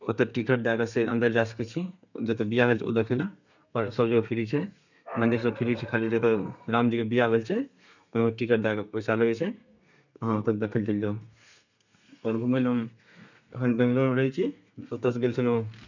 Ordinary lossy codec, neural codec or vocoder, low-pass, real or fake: none; codec, 32 kHz, 1.9 kbps, SNAC; 7.2 kHz; fake